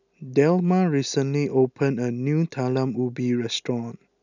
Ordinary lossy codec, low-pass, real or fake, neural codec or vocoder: none; 7.2 kHz; real; none